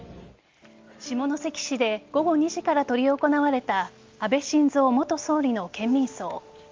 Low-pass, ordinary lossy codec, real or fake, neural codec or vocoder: 7.2 kHz; Opus, 32 kbps; real; none